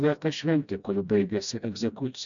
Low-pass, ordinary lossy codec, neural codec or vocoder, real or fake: 7.2 kHz; MP3, 96 kbps; codec, 16 kHz, 1 kbps, FreqCodec, smaller model; fake